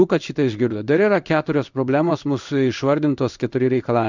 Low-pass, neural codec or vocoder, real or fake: 7.2 kHz; codec, 16 kHz in and 24 kHz out, 1 kbps, XY-Tokenizer; fake